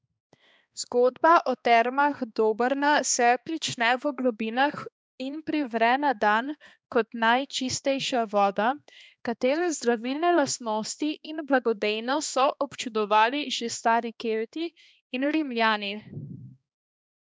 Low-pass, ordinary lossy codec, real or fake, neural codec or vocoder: none; none; fake; codec, 16 kHz, 2 kbps, X-Codec, HuBERT features, trained on balanced general audio